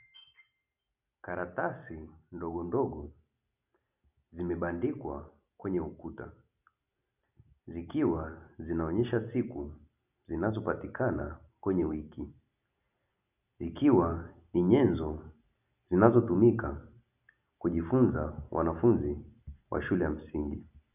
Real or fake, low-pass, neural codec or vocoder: real; 3.6 kHz; none